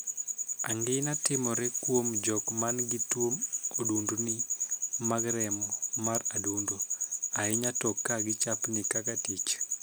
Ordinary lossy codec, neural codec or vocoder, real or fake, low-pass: none; none; real; none